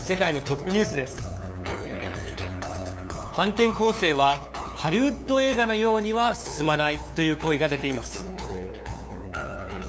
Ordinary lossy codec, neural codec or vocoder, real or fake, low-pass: none; codec, 16 kHz, 2 kbps, FunCodec, trained on LibriTTS, 25 frames a second; fake; none